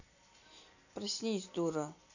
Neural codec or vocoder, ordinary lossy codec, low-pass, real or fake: none; none; 7.2 kHz; real